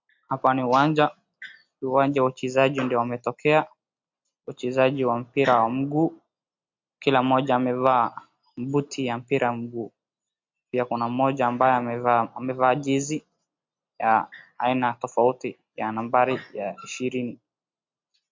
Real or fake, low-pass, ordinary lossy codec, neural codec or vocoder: real; 7.2 kHz; MP3, 48 kbps; none